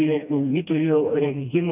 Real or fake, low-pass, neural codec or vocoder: fake; 3.6 kHz; codec, 16 kHz, 1 kbps, FreqCodec, smaller model